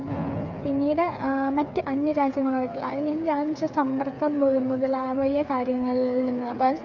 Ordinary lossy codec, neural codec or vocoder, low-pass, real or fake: none; codec, 16 kHz, 8 kbps, FreqCodec, smaller model; 7.2 kHz; fake